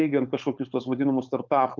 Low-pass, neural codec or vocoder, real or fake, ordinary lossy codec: 7.2 kHz; codec, 16 kHz, 4.8 kbps, FACodec; fake; Opus, 32 kbps